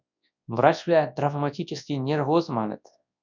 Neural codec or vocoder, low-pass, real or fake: codec, 24 kHz, 0.9 kbps, WavTokenizer, large speech release; 7.2 kHz; fake